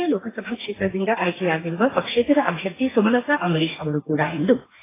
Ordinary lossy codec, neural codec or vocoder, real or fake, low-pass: AAC, 16 kbps; codec, 44.1 kHz, 2.6 kbps, DAC; fake; 3.6 kHz